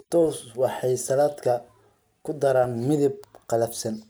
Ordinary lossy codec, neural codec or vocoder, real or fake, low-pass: none; none; real; none